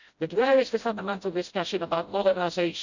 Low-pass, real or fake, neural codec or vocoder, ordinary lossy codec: 7.2 kHz; fake; codec, 16 kHz, 0.5 kbps, FreqCodec, smaller model; Opus, 64 kbps